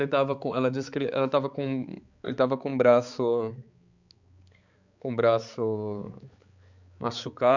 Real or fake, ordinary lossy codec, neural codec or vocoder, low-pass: fake; Opus, 64 kbps; codec, 16 kHz, 4 kbps, X-Codec, HuBERT features, trained on balanced general audio; 7.2 kHz